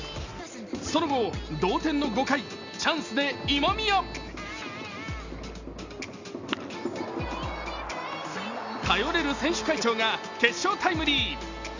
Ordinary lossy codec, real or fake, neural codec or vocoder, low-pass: Opus, 64 kbps; real; none; 7.2 kHz